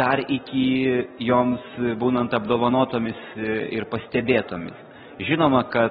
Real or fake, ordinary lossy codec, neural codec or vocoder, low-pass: real; AAC, 16 kbps; none; 10.8 kHz